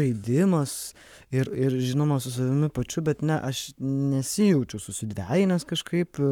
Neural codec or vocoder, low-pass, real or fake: codec, 44.1 kHz, 7.8 kbps, Pupu-Codec; 19.8 kHz; fake